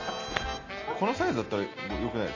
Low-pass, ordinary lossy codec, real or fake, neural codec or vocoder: 7.2 kHz; AAC, 32 kbps; real; none